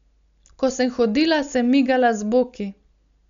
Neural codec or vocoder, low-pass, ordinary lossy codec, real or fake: none; 7.2 kHz; none; real